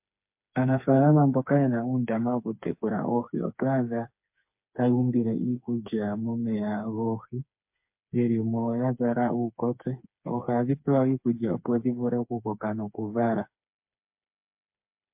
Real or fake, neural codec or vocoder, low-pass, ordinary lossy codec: fake; codec, 16 kHz, 4 kbps, FreqCodec, smaller model; 3.6 kHz; MP3, 32 kbps